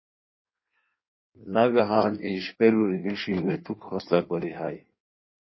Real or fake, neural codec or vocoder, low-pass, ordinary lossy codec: fake; codec, 16 kHz in and 24 kHz out, 1.1 kbps, FireRedTTS-2 codec; 7.2 kHz; MP3, 24 kbps